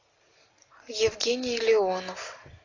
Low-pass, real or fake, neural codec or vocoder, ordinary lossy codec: 7.2 kHz; real; none; AAC, 32 kbps